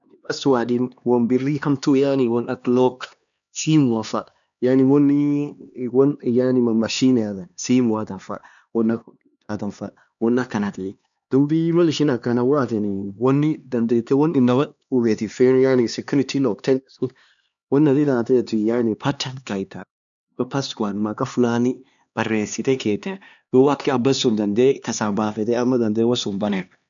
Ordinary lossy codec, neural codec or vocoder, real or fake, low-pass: none; codec, 16 kHz, 2 kbps, X-Codec, HuBERT features, trained on LibriSpeech; fake; 7.2 kHz